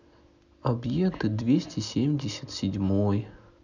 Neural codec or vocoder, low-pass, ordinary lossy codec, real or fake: none; 7.2 kHz; none; real